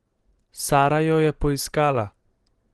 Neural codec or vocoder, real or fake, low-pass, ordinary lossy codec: none; real; 10.8 kHz; Opus, 16 kbps